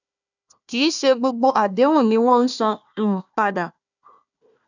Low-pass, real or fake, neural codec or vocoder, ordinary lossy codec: 7.2 kHz; fake; codec, 16 kHz, 1 kbps, FunCodec, trained on Chinese and English, 50 frames a second; none